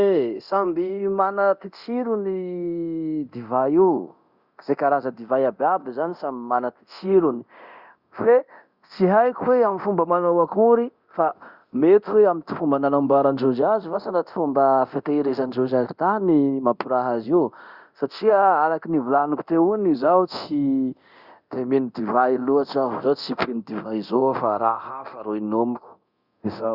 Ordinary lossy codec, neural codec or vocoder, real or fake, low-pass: Opus, 64 kbps; codec, 24 kHz, 0.9 kbps, DualCodec; fake; 5.4 kHz